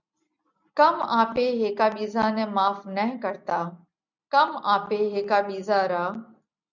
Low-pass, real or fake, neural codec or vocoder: 7.2 kHz; real; none